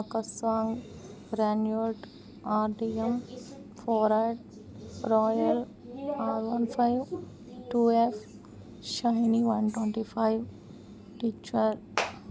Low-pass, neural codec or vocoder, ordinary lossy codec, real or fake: none; none; none; real